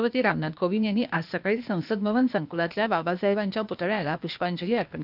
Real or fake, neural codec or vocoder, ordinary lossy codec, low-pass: fake; codec, 16 kHz, 0.8 kbps, ZipCodec; none; 5.4 kHz